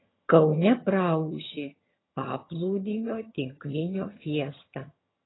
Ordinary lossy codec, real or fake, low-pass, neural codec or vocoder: AAC, 16 kbps; fake; 7.2 kHz; vocoder, 22.05 kHz, 80 mel bands, HiFi-GAN